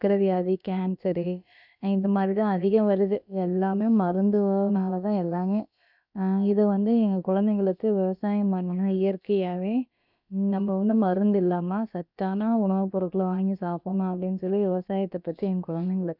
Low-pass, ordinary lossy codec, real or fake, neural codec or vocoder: 5.4 kHz; none; fake; codec, 16 kHz, about 1 kbps, DyCAST, with the encoder's durations